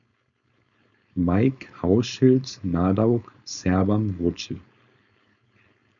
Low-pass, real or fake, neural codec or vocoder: 7.2 kHz; fake; codec, 16 kHz, 4.8 kbps, FACodec